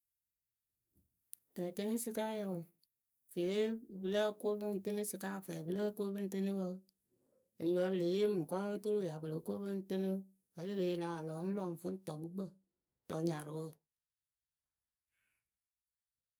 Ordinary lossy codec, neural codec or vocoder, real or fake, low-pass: none; codec, 44.1 kHz, 2.6 kbps, SNAC; fake; none